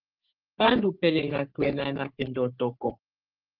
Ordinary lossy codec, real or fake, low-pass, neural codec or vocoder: Opus, 32 kbps; fake; 5.4 kHz; codec, 44.1 kHz, 3.4 kbps, Pupu-Codec